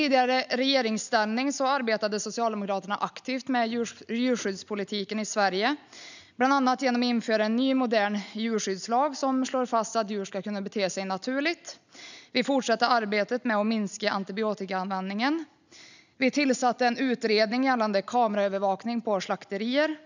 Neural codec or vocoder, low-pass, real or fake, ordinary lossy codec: none; 7.2 kHz; real; none